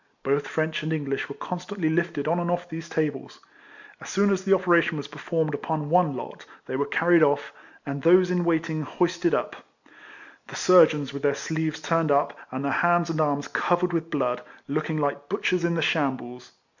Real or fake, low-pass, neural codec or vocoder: real; 7.2 kHz; none